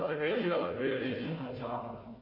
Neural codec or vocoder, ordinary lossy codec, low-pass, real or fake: codec, 16 kHz, 1 kbps, FunCodec, trained on Chinese and English, 50 frames a second; MP3, 24 kbps; 5.4 kHz; fake